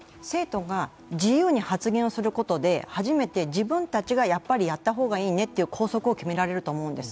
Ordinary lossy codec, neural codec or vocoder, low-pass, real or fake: none; none; none; real